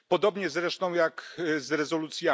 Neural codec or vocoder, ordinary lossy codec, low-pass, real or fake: none; none; none; real